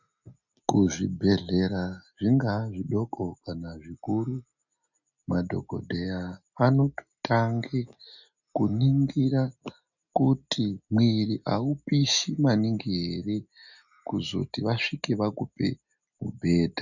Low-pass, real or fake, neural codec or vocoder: 7.2 kHz; real; none